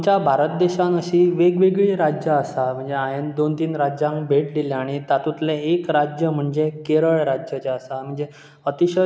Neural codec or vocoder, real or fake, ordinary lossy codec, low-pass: none; real; none; none